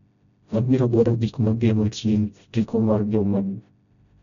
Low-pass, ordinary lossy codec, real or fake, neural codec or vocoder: 7.2 kHz; none; fake; codec, 16 kHz, 0.5 kbps, FreqCodec, smaller model